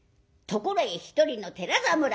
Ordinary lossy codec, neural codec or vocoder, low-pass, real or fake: none; none; none; real